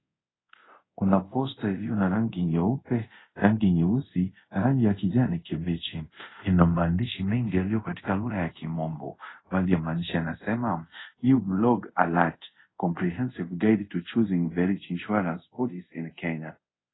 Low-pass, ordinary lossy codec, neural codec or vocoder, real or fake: 7.2 kHz; AAC, 16 kbps; codec, 24 kHz, 0.5 kbps, DualCodec; fake